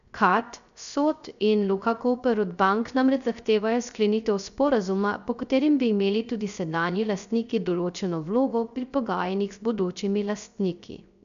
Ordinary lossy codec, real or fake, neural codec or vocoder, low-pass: none; fake; codec, 16 kHz, 0.3 kbps, FocalCodec; 7.2 kHz